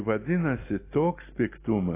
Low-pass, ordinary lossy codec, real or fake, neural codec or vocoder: 3.6 kHz; AAC, 16 kbps; real; none